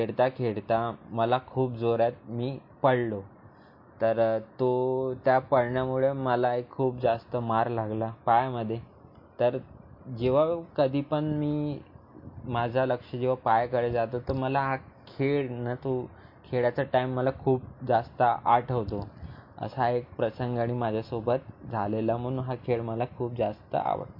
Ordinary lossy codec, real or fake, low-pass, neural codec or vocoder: MP3, 32 kbps; real; 5.4 kHz; none